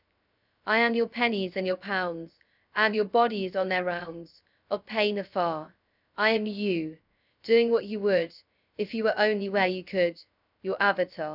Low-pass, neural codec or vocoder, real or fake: 5.4 kHz; codec, 16 kHz, 0.3 kbps, FocalCodec; fake